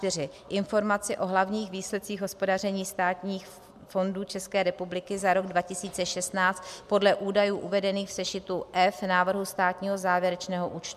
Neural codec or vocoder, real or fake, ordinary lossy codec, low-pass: none; real; MP3, 96 kbps; 14.4 kHz